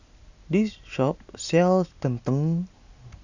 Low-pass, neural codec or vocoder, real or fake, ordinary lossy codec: 7.2 kHz; none; real; none